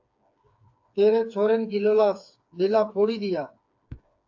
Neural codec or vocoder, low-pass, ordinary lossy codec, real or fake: codec, 16 kHz, 4 kbps, FreqCodec, smaller model; 7.2 kHz; AAC, 48 kbps; fake